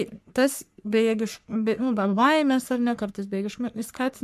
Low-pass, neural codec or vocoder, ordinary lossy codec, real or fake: 14.4 kHz; codec, 44.1 kHz, 3.4 kbps, Pupu-Codec; AAC, 96 kbps; fake